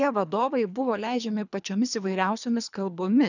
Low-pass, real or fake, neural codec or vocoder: 7.2 kHz; fake; codec, 24 kHz, 3 kbps, HILCodec